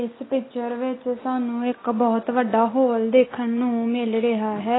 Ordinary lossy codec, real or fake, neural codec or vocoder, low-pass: AAC, 16 kbps; real; none; 7.2 kHz